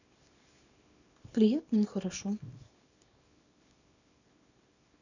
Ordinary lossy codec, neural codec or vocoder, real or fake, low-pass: none; codec, 24 kHz, 0.9 kbps, WavTokenizer, small release; fake; 7.2 kHz